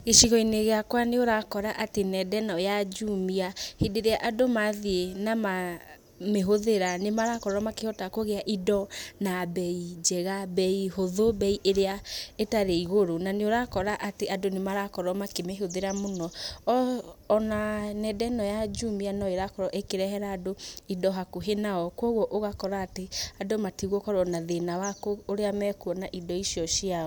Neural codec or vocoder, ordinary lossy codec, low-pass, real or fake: none; none; none; real